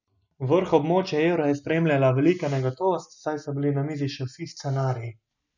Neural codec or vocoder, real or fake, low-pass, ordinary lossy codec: none; real; 7.2 kHz; none